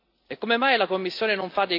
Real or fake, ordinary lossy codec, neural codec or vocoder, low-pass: real; AAC, 32 kbps; none; 5.4 kHz